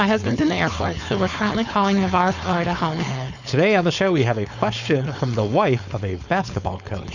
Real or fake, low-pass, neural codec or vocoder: fake; 7.2 kHz; codec, 16 kHz, 4.8 kbps, FACodec